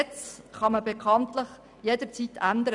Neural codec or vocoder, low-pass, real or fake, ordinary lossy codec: none; 10.8 kHz; real; none